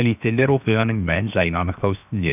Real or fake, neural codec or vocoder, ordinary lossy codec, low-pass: fake; codec, 16 kHz, 0.7 kbps, FocalCodec; none; 3.6 kHz